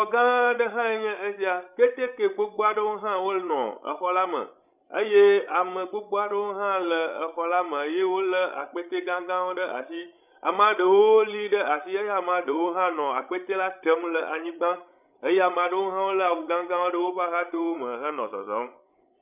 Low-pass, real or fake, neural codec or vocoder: 3.6 kHz; fake; codec, 16 kHz, 16 kbps, FreqCodec, larger model